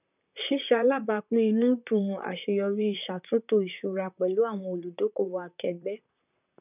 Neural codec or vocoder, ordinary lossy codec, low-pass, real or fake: vocoder, 44.1 kHz, 128 mel bands, Pupu-Vocoder; none; 3.6 kHz; fake